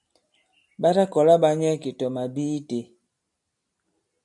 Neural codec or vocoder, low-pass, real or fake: none; 10.8 kHz; real